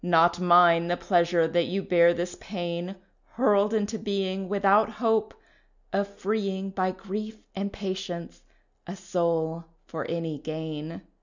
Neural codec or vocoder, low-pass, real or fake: none; 7.2 kHz; real